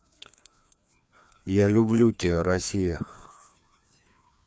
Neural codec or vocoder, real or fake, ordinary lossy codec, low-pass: codec, 16 kHz, 2 kbps, FreqCodec, larger model; fake; none; none